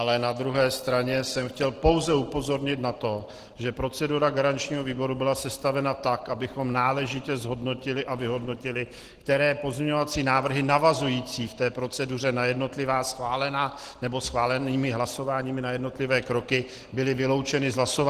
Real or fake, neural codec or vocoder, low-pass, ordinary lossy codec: real; none; 14.4 kHz; Opus, 16 kbps